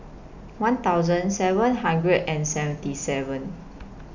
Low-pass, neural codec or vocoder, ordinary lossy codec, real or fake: 7.2 kHz; none; none; real